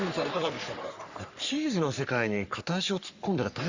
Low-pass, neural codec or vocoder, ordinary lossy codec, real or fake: 7.2 kHz; codec, 44.1 kHz, 3.4 kbps, Pupu-Codec; Opus, 64 kbps; fake